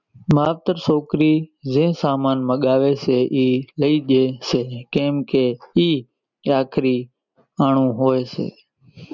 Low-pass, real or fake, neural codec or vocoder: 7.2 kHz; real; none